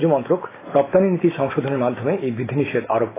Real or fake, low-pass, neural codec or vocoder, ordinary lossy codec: real; 3.6 kHz; none; AAC, 16 kbps